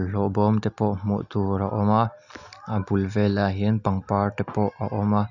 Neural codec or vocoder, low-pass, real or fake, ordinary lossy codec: none; 7.2 kHz; real; none